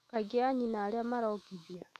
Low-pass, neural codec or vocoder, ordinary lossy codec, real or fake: 14.4 kHz; autoencoder, 48 kHz, 128 numbers a frame, DAC-VAE, trained on Japanese speech; none; fake